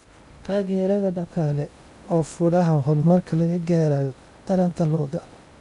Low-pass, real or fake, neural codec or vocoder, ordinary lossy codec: 10.8 kHz; fake; codec, 16 kHz in and 24 kHz out, 0.6 kbps, FocalCodec, streaming, 2048 codes; none